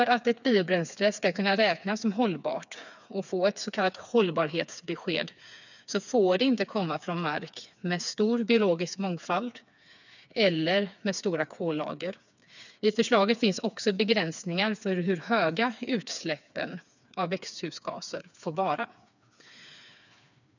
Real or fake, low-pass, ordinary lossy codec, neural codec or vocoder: fake; 7.2 kHz; none; codec, 16 kHz, 4 kbps, FreqCodec, smaller model